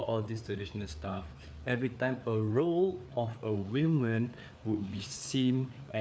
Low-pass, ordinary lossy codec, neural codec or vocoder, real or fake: none; none; codec, 16 kHz, 4 kbps, FreqCodec, larger model; fake